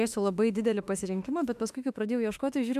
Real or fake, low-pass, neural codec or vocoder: fake; 14.4 kHz; autoencoder, 48 kHz, 32 numbers a frame, DAC-VAE, trained on Japanese speech